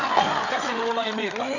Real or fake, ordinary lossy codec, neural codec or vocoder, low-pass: fake; none; codec, 16 kHz, 8 kbps, FreqCodec, larger model; 7.2 kHz